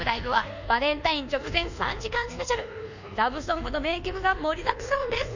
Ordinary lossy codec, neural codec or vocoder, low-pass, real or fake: none; codec, 24 kHz, 1.2 kbps, DualCodec; 7.2 kHz; fake